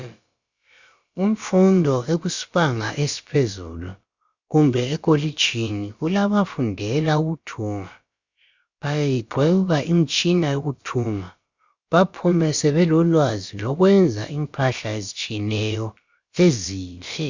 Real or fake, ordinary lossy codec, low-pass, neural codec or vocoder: fake; Opus, 64 kbps; 7.2 kHz; codec, 16 kHz, about 1 kbps, DyCAST, with the encoder's durations